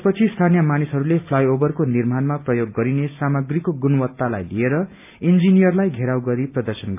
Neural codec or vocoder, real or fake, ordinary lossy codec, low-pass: none; real; AAC, 32 kbps; 3.6 kHz